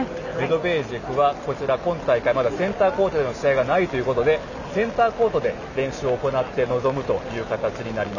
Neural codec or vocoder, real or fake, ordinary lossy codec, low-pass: none; real; none; 7.2 kHz